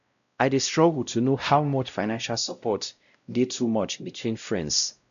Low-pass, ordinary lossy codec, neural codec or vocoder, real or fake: 7.2 kHz; none; codec, 16 kHz, 0.5 kbps, X-Codec, WavLM features, trained on Multilingual LibriSpeech; fake